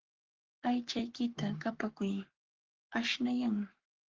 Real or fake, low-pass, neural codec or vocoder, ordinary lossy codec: fake; 7.2 kHz; codec, 44.1 kHz, 7.8 kbps, Pupu-Codec; Opus, 16 kbps